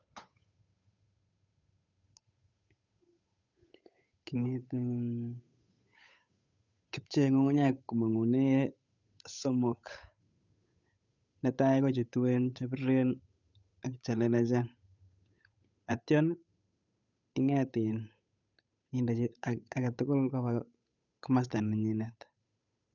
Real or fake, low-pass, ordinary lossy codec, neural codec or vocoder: fake; 7.2 kHz; none; codec, 16 kHz, 8 kbps, FunCodec, trained on Chinese and English, 25 frames a second